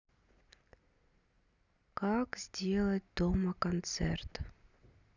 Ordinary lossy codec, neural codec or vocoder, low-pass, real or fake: none; none; 7.2 kHz; real